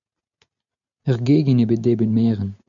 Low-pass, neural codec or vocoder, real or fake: 7.2 kHz; none; real